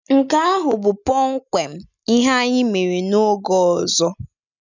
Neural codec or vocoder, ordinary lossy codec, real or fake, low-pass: none; none; real; 7.2 kHz